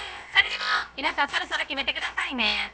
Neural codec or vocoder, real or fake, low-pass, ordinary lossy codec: codec, 16 kHz, about 1 kbps, DyCAST, with the encoder's durations; fake; none; none